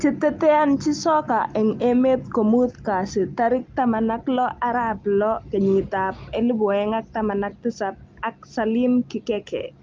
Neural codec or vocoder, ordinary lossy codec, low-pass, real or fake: none; Opus, 32 kbps; 7.2 kHz; real